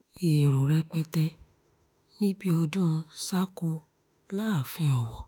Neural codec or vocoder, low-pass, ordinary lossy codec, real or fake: autoencoder, 48 kHz, 32 numbers a frame, DAC-VAE, trained on Japanese speech; none; none; fake